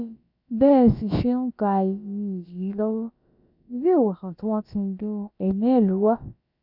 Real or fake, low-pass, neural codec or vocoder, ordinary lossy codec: fake; 5.4 kHz; codec, 16 kHz, about 1 kbps, DyCAST, with the encoder's durations; none